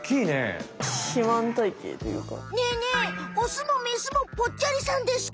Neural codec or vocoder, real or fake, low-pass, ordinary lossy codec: none; real; none; none